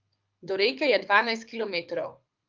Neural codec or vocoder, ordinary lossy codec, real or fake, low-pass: codec, 24 kHz, 6 kbps, HILCodec; Opus, 24 kbps; fake; 7.2 kHz